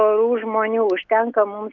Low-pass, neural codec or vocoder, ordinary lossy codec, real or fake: 7.2 kHz; none; Opus, 32 kbps; real